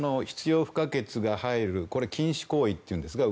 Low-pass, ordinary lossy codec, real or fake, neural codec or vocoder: none; none; real; none